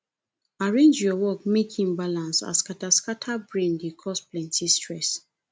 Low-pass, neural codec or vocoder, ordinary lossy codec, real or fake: none; none; none; real